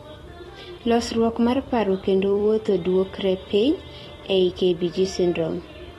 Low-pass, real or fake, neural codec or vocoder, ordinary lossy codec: 19.8 kHz; fake; vocoder, 44.1 kHz, 128 mel bands every 256 samples, BigVGAN v2; AAC, 32 kbps